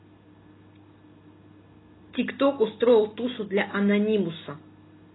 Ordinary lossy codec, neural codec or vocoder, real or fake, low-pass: AAC, 16 kbps; none; real; 7.2 kHz